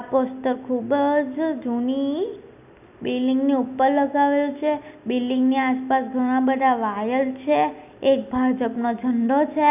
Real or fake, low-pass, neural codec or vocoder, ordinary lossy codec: real; 3.6 kHz; none; none